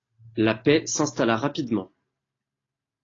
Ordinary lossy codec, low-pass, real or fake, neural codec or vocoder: AAC, 32 kbps; 7.2 kHz; real; none